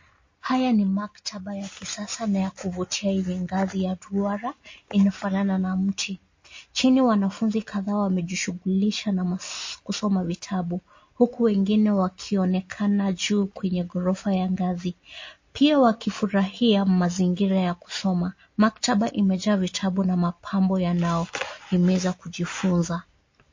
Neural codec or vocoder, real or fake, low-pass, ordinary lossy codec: none; real; 7.2 kHz; MP3, 32 kbps